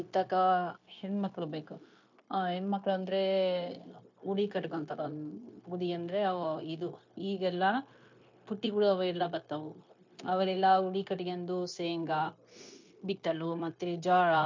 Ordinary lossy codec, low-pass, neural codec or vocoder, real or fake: MP3, 48 kbps; 7.2 kHz; codec, 16 kHz, 0.9 kbps, LongCat-Audio-Codec; fake